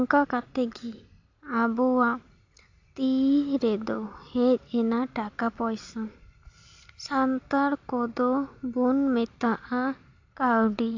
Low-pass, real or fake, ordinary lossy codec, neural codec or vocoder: 7.2 kHz; real; AAC, 48 kbps; none